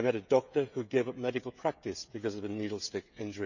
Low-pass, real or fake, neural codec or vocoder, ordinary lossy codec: 7.2 kHz; fake; codec, 16 kHz, 16 kbps, FreqCodec, smaller model; none